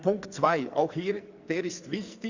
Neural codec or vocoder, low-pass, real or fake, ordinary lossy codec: codec, 44.1 kHz, 2.6 kbps, SNAC; 7.2 kHz; fake; Opus, 64 kbps